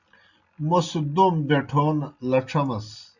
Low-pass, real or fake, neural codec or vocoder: 7.2 kHz; real; none